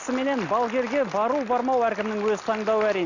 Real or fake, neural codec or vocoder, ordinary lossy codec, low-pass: real; none; none; 7.2 kHz